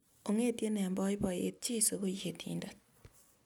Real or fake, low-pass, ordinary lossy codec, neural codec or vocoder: real; none; none; none